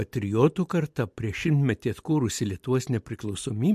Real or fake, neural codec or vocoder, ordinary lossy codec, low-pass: real; none; MP3, 64 kbps; 14.4 kHz